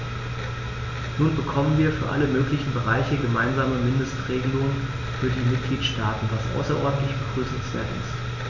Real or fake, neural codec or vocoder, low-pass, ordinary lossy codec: real; none; 7.2 kHz; none